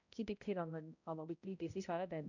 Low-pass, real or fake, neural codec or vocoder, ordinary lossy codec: 7.2 kHz; fake; codec, 16 kHz, 0.5 kbps, X-Codec, HuBERT features, trained on balanced general audio; none